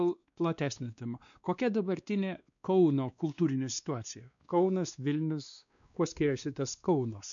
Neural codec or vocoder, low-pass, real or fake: codec, 16 kHz, 2 kbps, X-Codec, WavLM features, trained on Multilingual LibriSpeech; 7.2 kHz; fake